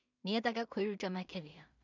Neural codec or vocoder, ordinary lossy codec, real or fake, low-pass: codec, 16 kHz in and 24 kHz out, 0.4 kbps, LongCat-Audio-Codec, two codebook decoder; none; fake; 7.2 kHz